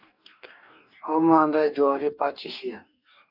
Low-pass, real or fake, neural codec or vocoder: 5.4 kHz; fake; codec, 44.1 kHz, 2.6 kbps, DAC